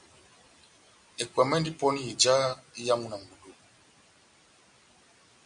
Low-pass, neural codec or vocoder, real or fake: 9.9 kHz; none; real